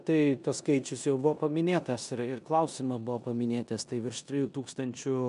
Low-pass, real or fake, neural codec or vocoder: 10.8 kHz; fake; codec, 16 kHz in and 24 kHz out, 0.9 kbps, LongCat-Audio-Codec, four codebook decoder